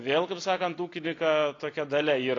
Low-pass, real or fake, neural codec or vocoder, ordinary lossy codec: 7.2 kHz; real; none; AAC, 32 kbps